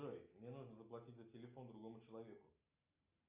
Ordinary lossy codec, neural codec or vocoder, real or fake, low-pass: Opus, 64 kbps; none; real; 3.6 kHz